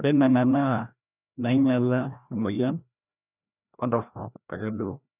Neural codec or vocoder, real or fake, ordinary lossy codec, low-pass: codec, 16 kHz, 1 kbps, FreqCodec, larger model; fake; none; 3.6 kHz